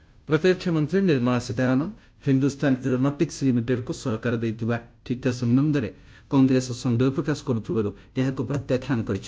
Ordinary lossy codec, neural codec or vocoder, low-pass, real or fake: none; codec, 16 kHz, 0.5 kbps, FunCodec, trained on Chinese and English, 25 frames a second; none; fake